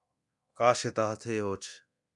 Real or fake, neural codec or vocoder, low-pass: fake; codec, 24 kHz, 0.9 kbps, DualCodec; 10.8 kHz